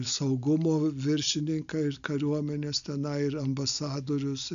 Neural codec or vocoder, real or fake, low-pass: none; real; 7.2 kHz